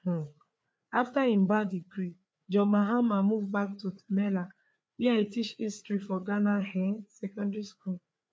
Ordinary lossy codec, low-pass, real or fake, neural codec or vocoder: none; none; fake; codec, 16 kHz, 4 kbps, FreqCodec, larger model